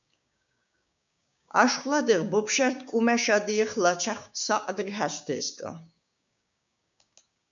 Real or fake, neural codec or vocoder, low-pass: fake; codec, 16 kHz, 6 kbps, DAC; 7.2 kHz